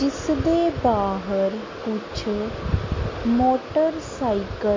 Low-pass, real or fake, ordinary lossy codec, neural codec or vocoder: 7.2 kHz; real; MP3, 32 kbps; none